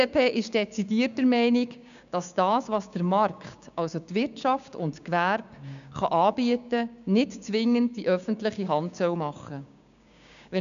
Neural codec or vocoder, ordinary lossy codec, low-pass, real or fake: codec, 16 kHz, 6 kbps, DAC; none; 7.2 kHz; fake